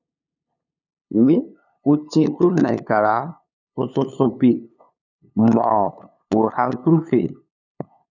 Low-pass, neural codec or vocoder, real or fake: 7.2 kHz; codec, 16 kHz, 2 kbps, FunCodec, trained on LibriTTS, 25 frames a second; fake